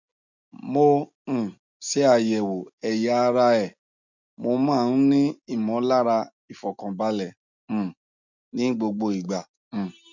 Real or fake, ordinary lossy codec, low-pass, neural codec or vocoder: real; none; 7.2 kHz; none